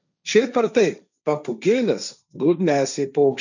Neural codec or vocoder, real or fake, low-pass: codec, 16 kHz, 1.1 kbps, Voila-Tokenizer; fake; 7.2 kHz